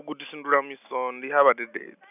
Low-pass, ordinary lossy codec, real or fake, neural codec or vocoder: 3.6 kHz; none; real; none